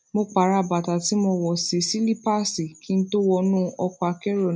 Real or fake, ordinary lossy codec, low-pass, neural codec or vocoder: real; none; none; none